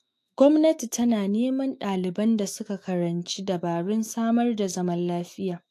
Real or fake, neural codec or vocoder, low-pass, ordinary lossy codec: fake; autoencoder, 48 kHz, 128 numbers a frame, DAC-VAE, trained on Japanese speech; 14.4 kHz; AAC, 64 kbps